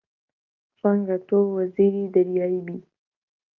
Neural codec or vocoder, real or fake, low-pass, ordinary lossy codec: none; real; 7.2 kHz; Opus, 32 kbps